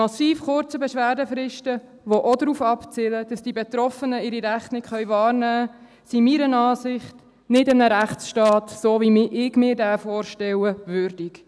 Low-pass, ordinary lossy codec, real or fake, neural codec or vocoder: none; none; real; none